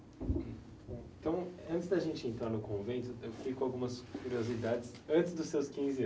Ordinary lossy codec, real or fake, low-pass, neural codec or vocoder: none; real; none; none